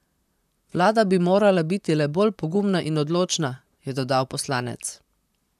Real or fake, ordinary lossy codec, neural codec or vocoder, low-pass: fake; none; vocoder, 44.1 kHz, 128 mel bands, Pupu-Vocoder; 14.4 kHz